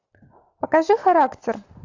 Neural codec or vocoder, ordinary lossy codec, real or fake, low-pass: none; AAC, 48 kbps; real; 7.2 kHz